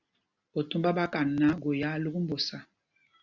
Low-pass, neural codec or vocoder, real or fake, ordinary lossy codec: 7.2 kHz; none; real; Opus, 64 kbps